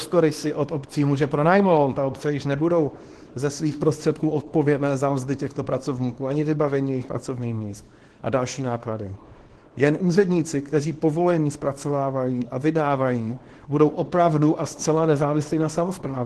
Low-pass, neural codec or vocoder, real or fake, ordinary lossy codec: 10.8 kHz; codec, 24 kHz, 0.9 kbps, WavTokenizer, small release; fake; Opus, 16 kbps